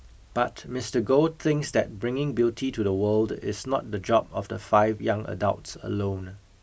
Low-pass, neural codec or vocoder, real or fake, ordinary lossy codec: none; none; real; none